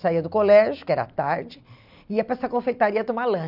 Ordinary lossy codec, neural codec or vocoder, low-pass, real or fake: none; none; 5.4 kHz; real